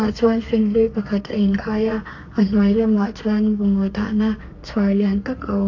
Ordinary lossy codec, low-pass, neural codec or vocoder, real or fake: AAC, 48 kbps; 7.2 kHz; codec, 32 kHz, 1.9 kbps, SNAC; fake